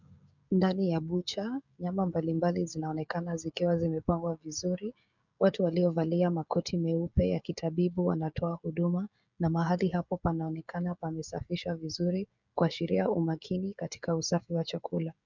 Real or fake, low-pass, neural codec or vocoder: fake; 7.2 kHz; codec, 16 kHz, 16 kbps, FreqCodec, smaller model